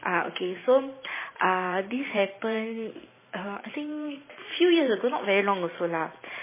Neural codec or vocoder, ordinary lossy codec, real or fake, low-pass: vocoder, 44.1 kHz, 128 mel bands every 512 samples, BigVGAN v2; MP3, 16 kbps; fake; 3.6 kHz